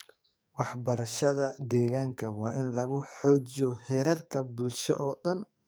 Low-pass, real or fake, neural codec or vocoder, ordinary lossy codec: none; fake; codec, 44.1 kHz, 2.6 kbps, SNAC; none